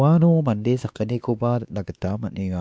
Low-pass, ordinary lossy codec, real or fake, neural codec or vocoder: none; none; fake; codec, 16 kHz, 2 kbps, X-Codec, WavLM features, trained on Multilingual LibriSpeech